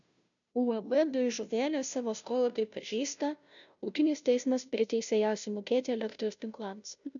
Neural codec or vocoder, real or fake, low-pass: codec, 16 kHz, 0.5 kbps, FunCodec, trained on Chinese and English, 25 frames a second; fake; 7.2 kHz